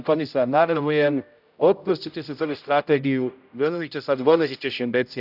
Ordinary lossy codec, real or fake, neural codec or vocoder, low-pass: none; fake; codec, 16 kHz, 0.5 kbps, X-Codec, HuBERT features, trained on general audio; 5.4 kHz